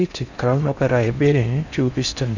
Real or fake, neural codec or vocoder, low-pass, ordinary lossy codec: fake; codec, 16 kHz in and 24 kHz out, 0.6 kbps, FocalCodec, streaming, 4096 codes; 7.2 kHz; none